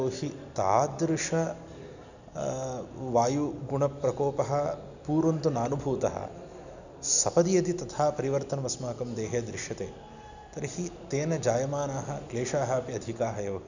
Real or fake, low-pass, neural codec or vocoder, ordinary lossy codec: real; 7.2 kHz; none; none